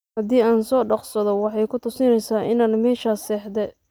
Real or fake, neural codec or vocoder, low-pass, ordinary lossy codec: real; none; none; none